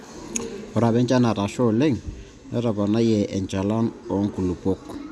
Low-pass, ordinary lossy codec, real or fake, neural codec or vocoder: none; none; real; none